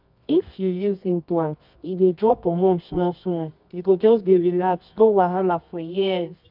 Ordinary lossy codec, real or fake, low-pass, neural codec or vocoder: MP3, 48 kbps; fake; 5.4 kHz; codec, 24 kHz, 0.9 kbps, WavTokenizer, medium music audio release